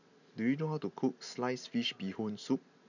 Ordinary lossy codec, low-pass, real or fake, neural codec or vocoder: none; 7.2 kHz; real; none